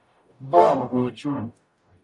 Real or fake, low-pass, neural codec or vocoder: fake; 10.8 kHz; codec, 44.1 kHz, 0.9 kbps, DAC